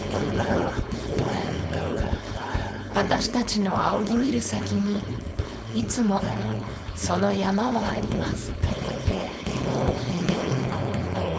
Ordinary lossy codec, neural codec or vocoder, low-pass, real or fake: none; codec, 16 kHz, 4.8 kbps, FACodec; none; fake